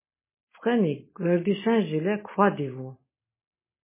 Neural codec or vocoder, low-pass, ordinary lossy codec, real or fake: none; 3.6 kHz; MP3, 16 kbps; real